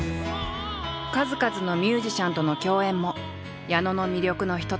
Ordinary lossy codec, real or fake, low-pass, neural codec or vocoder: none; real; none; none